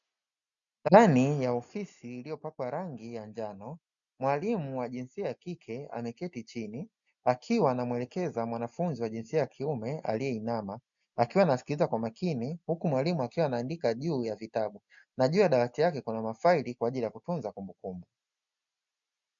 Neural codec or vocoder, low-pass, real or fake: none; 7.2 kHz; real